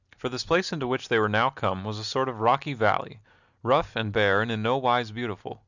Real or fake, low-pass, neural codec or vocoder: real; 7.2 kHz; none